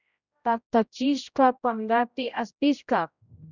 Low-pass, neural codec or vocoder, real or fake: 7.2 kHz; codec, 16 kHz, 0.5 kbps, X-Codec, HuBERT features, trained on general audio; fake